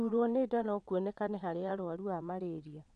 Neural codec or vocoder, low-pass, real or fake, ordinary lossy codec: vocoder, 22.05 kHz, 80 mel bands, WaveNeXt; 9.9 kHz; fake; none